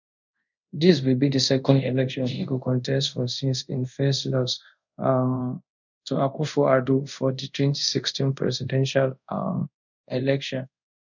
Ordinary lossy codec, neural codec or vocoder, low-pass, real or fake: none; codec, 24 kHz, 0.5 kbps, DualCodec; 7.2 kHz; fake